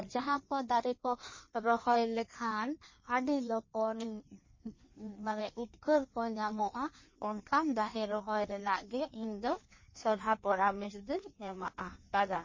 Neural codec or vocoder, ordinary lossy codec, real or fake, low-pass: codec, 16 kHz in and 24 kHz out, 1.1 kbps, FireRedTTS-2 codec; MP3, 32 kbps; fake; 7.2 kHz